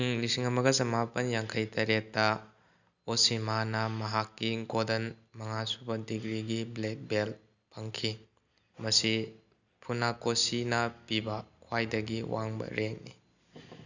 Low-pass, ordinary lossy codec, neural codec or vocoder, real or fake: 7.2 kHz; none; none; real